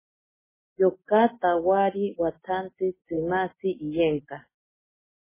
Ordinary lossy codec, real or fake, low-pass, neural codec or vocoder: MP3, 16 kbps; real; 3.6 kHz; none